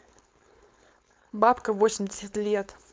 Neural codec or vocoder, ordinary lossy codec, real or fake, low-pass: codec, 16 kHz, 4.8 kbps, FACodec; none; fake; none